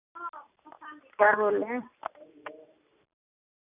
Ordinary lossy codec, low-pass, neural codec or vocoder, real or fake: none; 3.6 kHz; none; real